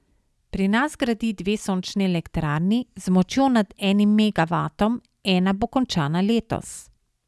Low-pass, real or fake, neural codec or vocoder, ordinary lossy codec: none; real; none; none